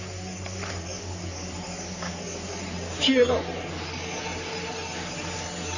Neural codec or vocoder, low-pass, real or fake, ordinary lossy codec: codec, 44.1 kHz, 3.4 kbps, Pupu-Codec; 7.2 kHz; fake; none